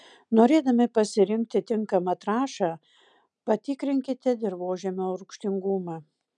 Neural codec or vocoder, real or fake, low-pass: none; real; 9.9 kHz